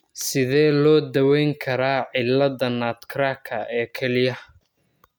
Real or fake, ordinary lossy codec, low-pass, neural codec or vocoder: real; none; none; none